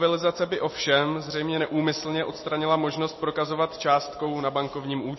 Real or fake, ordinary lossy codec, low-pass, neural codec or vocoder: real; MP3, 24 kbps; 7.2 kHz; none